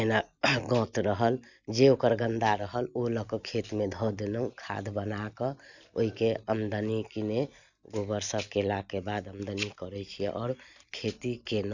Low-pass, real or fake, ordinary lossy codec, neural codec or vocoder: 7.2 kHz; real; none; none